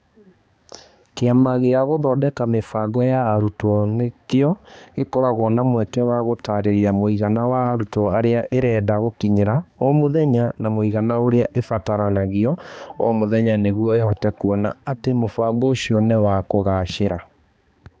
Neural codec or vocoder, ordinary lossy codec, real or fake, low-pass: codec, 16 kHz, 2 kbps, X-Codec, HuBERT features, trained on balanced general audio; none; fake; none